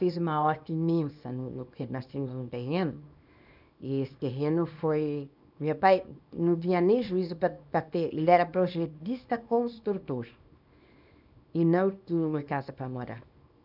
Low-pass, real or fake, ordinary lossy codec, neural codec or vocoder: 5.4 kHz; fake; none; codec, 24 kHz, 0.9 kbps, WavTokenizer, small release